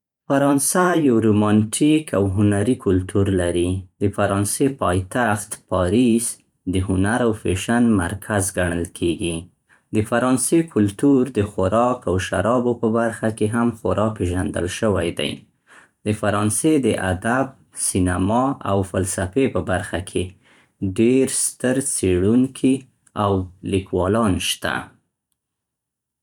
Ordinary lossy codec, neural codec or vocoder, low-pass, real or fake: none; vocoder, 44.1 kHz, 128 mel bands every 512 samples, BigVGAN v2; 19.8 kHz; fake